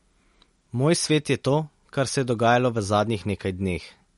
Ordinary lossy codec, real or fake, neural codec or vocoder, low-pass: MP3, 48 kbps; real; none; 19.8 kHz